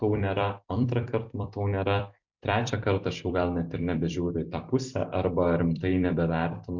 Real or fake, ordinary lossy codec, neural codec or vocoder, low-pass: fake; MP3, 64 kbps; vocoder, 44.1 kHz, 128 mel bands every 256 samples, BigVGAN v2; 7.2 kHz